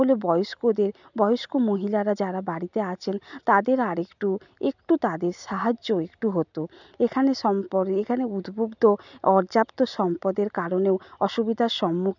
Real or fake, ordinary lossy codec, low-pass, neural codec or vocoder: real; none; 7.2 kHz; none